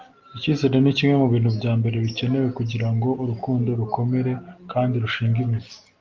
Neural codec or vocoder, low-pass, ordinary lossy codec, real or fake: none; 7.2 kHz; Opus, 32 kbps; real